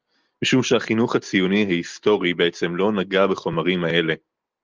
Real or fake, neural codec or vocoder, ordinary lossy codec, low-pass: real; none; Opus, 32 kbps; 7.2 kHz